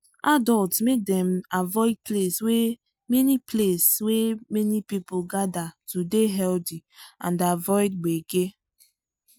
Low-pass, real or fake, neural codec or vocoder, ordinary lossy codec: none; real; none; none